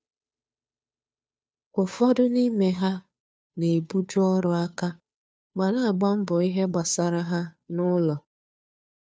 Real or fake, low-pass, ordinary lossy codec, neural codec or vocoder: fake; none; none; codec, 16 kHz, 2 kbps, FunCodec, trained on Chinese and English, 25 frames a second